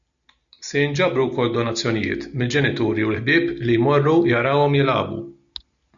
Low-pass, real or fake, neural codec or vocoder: 7.2 kHz; real; none